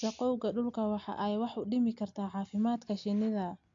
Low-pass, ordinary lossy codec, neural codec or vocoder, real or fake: 7.2 kHz; AAC, 48 kbps; none; real